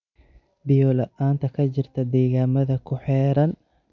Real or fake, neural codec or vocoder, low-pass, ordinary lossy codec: real; none; 7.2 kHz; none